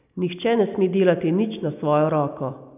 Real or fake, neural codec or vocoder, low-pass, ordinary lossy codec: real; none; 3.6 kHz; none